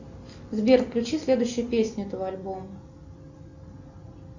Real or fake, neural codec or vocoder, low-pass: real; none; 7.2 kHz